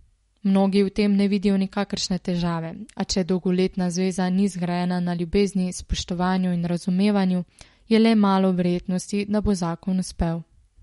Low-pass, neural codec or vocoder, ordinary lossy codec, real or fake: 19.8 kHz; none; MP3, 48 kbps; real